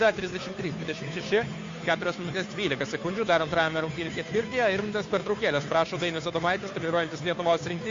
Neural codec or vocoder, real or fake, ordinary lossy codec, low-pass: codec, 16 kHz, 2 kbps, FunCodec, trained on Chinese and English, 25 frames a second; fake; MP3, 48 kbps; 7.2 kHz